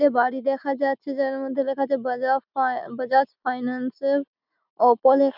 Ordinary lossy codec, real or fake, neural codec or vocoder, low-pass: none; real; none; 5.4 kHz